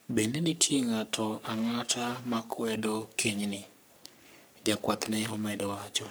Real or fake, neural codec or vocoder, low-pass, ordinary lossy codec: fake; codec, 44.1 kHz, 3.4 kbps, Pupu-Codec; none; none